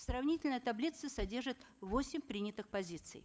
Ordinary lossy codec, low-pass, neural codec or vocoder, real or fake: none; none; codec, 16 kHz, 8 kbps, FunCodec, trained on Chinese and English, 25 frames a second; fake